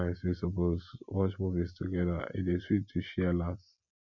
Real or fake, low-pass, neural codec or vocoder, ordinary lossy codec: real; 7.2 kHz; none; none